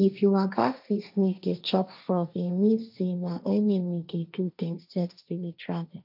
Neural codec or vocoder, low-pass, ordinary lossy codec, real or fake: codec, 16 kHz, 1.1 kbps, Voila-Tokenizer; 5.4 kHz; none; fake